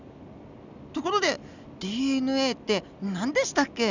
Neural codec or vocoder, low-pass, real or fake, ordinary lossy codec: none; 7.2 kHz; real; none